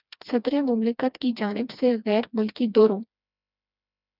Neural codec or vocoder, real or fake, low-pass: codec, 16 kHz, 2 kbps, FreqCodec, smaller model; fake; 5.4 kHz